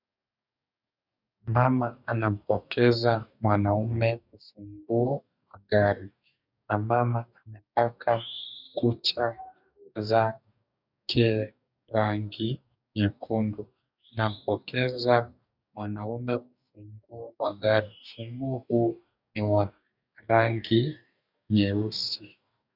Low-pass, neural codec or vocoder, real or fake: 5.4 kHz; codec, 44.1 kHz, 2.6 kbps, DAC; fake